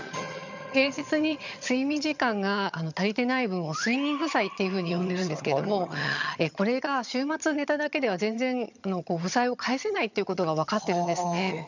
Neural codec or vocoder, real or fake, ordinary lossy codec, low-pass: vocoder, 22.05 kHz, 80 mel bands, HiFi-GAN; fake; none; 7.2 kHz